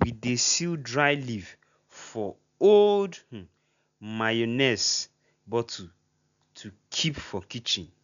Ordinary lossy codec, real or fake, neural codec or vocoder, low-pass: none; real; none; 7.2 kHz